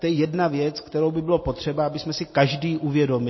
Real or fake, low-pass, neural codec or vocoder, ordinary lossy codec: real; 7.2 kHz; none; MP3, 24 kbps